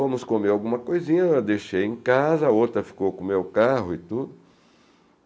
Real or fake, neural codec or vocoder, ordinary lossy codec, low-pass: real; none; none; none